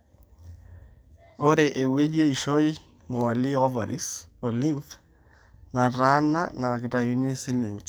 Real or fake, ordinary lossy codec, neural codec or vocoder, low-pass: fake; none; codec, 44.1 kHz, 2.6 kbps, SNAC; none